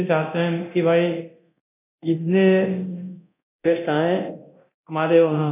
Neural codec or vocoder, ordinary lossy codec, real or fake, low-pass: codec, 24 kHz, 0.9 kbps, DualCodec; none; fake; 3.6 kHz